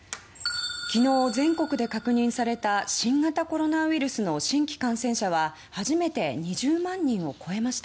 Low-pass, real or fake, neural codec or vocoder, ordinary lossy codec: none; real; none; none